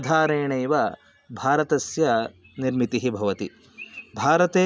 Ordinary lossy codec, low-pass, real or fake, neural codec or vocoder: none; none; real; none